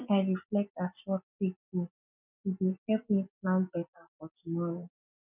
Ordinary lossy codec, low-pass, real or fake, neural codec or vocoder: none; 3.6 kHz; real; none